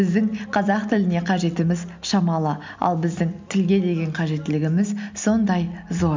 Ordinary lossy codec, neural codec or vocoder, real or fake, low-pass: MP3, 64 kbps; none; real; 7.2 kHz